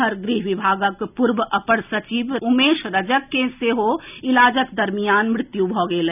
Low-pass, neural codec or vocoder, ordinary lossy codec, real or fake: 3.6 kHz; none; none; real